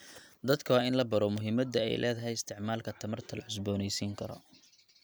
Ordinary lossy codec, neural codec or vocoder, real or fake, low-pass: none; none; real; none